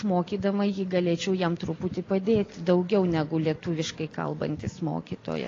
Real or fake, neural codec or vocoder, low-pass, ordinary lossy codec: real; none; 7.2 kHz; AAC, 32 kbps